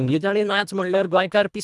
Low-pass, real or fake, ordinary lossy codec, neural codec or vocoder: none; fake; none; codec, 24 kHz, 1.5 kbps, HILCodec